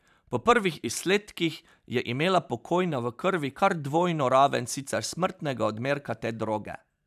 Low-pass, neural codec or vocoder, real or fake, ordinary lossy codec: 14.4 kHz; vocoder, 44.1 kHz, 128 mel bands every 512 samples, BigVGAN v2; fake; none